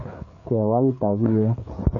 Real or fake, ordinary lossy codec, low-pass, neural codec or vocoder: fake; none; 7.2 kHz; codec, 16 kHz, 4 kbps, FreqCodec, larger model